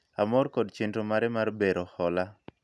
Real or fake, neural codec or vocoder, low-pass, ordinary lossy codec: real; none; none; none